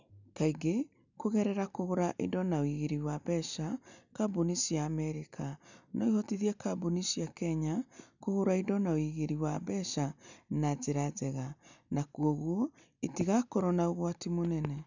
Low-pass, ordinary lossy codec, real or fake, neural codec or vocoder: 7.2 kHz; none; real; none